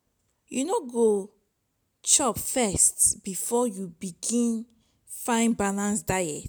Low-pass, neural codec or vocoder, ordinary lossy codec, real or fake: none; none; none; real